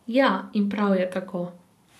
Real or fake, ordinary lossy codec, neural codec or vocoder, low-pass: fake; none; vocoder, 44.1 kHz, 128 mel bands every 512 samples, BigVGAN v2; 14.4 kHz